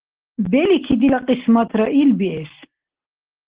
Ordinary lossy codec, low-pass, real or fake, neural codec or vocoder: Opus, 16 kbps; 3.6 kHz; real; none